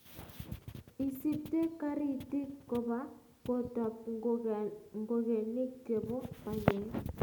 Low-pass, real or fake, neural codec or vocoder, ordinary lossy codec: none; real; none; none